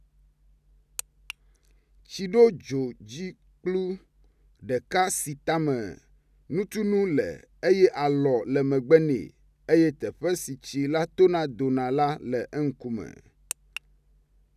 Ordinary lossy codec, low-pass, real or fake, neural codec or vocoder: none; 14.4 kHz; real; none